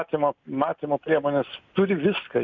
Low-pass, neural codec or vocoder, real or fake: 7.2 kHz; none; real